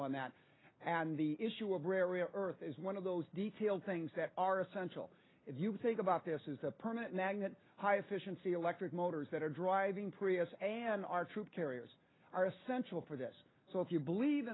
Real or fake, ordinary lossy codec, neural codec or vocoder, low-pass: real; AAC, 16 kbps; none; 7.2 kHz